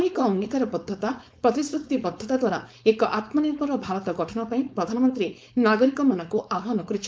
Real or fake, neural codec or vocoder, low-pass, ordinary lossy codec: fake; codec, 16 kHz, 4.8 kbps, FACodec; none; none